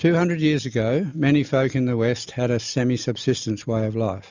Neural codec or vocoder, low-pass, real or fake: none; 7.2 kHz; real